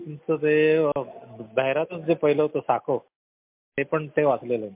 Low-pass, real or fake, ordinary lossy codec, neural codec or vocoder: 3.6 kHz; real; MP3, 32 kbps; none